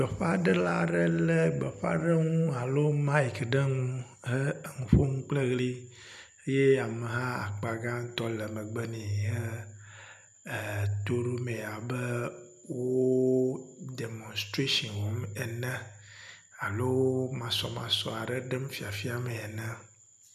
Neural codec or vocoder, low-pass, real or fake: none; 14.4 kHz; real